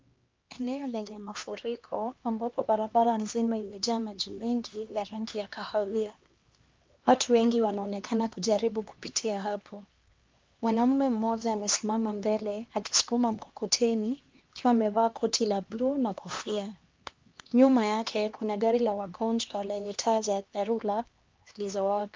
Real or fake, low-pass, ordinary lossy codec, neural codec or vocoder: fake; 7.2 kHz; Opus, 24 kbps; codec, 16 kHz, 2 kbps, X-Codec, HuBERT features, trained on LibriSpeech